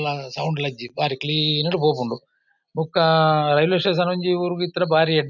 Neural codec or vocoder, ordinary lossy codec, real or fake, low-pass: none; none; real; 7.2 kHz